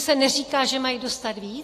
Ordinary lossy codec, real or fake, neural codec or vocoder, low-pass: AAC, 48 kbps; real; none; 14.4 kHz